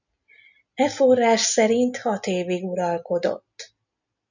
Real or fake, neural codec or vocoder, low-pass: real; none; 7.2 kHz